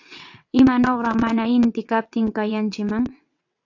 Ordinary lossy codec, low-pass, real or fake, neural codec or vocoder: AAC, 48 kbps; 7.2 kHz; fake; vocoder, 22.05 kHz, 80 mel bands, WaveNeXt